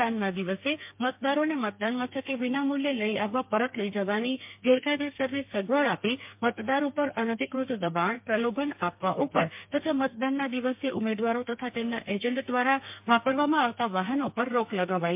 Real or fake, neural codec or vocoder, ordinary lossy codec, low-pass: fake; codec, 32 kHz, 1.9 kbps, SNAC; MP3, 32 kbps; 3.6 kHz